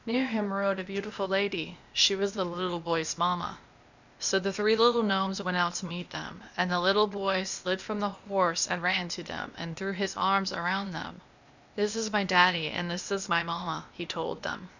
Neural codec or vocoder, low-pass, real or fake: codec, 16 kHz, 0.8 kbps, ZipCodec; 7.2 kHz; fake